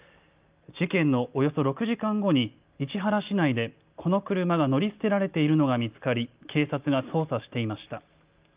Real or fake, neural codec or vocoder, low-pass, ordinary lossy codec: real; none; 3.6 kHz; Opus, 24 kbps